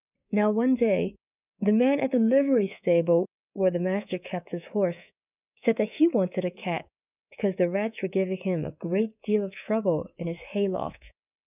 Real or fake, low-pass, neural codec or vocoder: fake; 3.6 kHz; codec, 24 kHz, 3.1 kbps, DualCodec